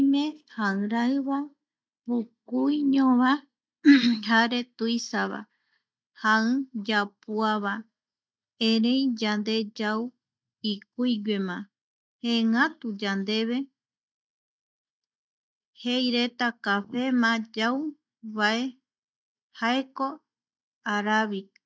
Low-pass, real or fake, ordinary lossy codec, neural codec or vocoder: none; real; none; none